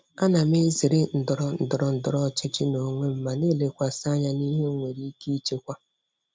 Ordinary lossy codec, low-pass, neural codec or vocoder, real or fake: none; none; none; real